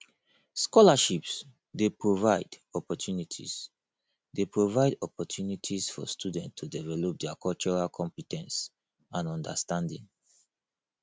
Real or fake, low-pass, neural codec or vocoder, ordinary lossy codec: real; none; none; none